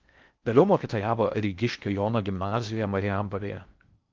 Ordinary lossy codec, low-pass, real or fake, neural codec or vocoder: Opus, 32 kbps; 7.2 kHz; fake; codec, 16 kHz in and 24 kHz out, 0.6 kbps, FocalCodec, streaming, 4096 codes